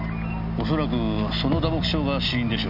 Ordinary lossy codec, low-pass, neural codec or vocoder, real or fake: none; 5.4 kHz; none; real